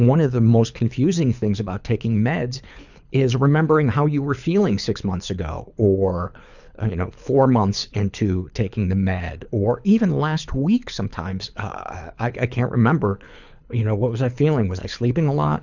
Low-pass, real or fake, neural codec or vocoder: 7.2 kHz; fake; codec, 24 kHz, 6 kbps, HILCodec